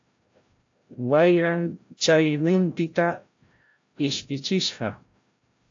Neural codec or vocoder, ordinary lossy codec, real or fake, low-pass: codec, 16 kHz, 0.5 kbps, FreqCodec, larger model; AAC, 48 kbps; fake; 7.2 kHz